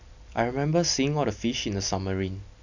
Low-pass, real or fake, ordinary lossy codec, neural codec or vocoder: 7.2 kHz; real; none; none